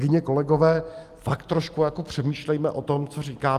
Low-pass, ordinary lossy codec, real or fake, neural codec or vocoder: 14.4 kHz; Opus, 32 kbps; real; none